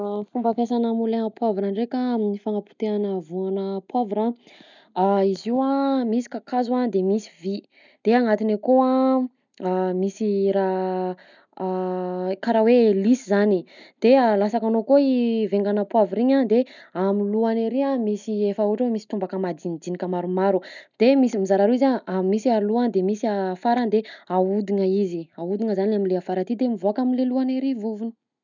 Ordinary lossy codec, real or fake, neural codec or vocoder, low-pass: none; real; none; 7.2 kHz